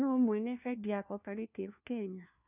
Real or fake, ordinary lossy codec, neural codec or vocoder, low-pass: fake; none; codec, 16 kHz, 1 kbps, FunCodec, trained on LibriTTS, 50 frames a second; 3.6 kHz